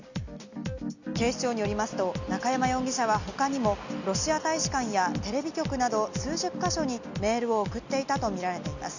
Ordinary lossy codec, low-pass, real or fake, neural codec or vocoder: none; 7.2 kHz; real; none